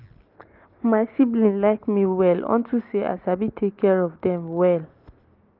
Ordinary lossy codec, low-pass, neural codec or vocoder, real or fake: Opus, 24 kbps; 5.4 kHz; none; real